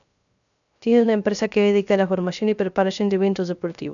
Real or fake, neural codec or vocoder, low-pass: fake; codec, 16 kHz, 0.3 kbps, FocalCodec; 7.2 kHz